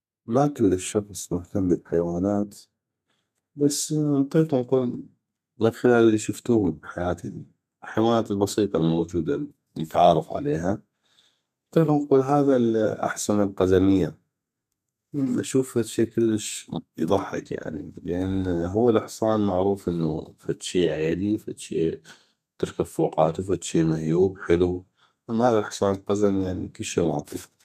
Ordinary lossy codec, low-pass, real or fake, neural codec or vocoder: none; 14.4 kHz; fake; codec, 32 kHz, 1.9 kbps, SNAC